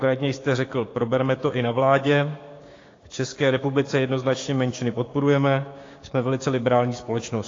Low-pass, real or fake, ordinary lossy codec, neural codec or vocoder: 7.2 kHz; fake; AAC, 32 kbps; codec, 16 kHz, 6 kbps, DAC